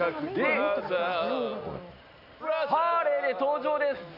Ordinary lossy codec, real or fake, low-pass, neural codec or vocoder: none; real; 5.4 kHz; none